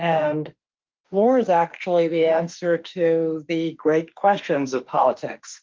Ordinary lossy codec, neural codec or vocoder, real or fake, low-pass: Opus, 32 kbps; autoencoder, 48 kHz, 32 numbers a frame, DAC-VAE, trained on Japanese speech; fake; 7.2 kHz